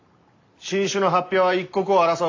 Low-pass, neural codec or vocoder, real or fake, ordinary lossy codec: 7.2 kHz; none; real; AAC, 48 kbps